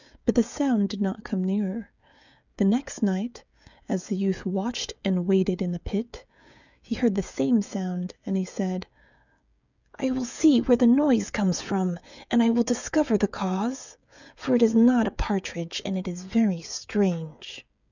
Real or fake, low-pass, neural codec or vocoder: fake; 7.2 kHz; codec, 16 kHz, 16 kbps, FreqCodec, smaller model